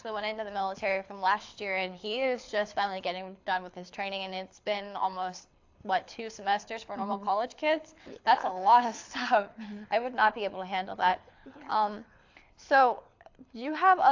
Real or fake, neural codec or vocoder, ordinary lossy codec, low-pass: fake; codec, 24 kHz, 6 kbps, HILCodec; AAC, 48 kbps; 7.2 kHz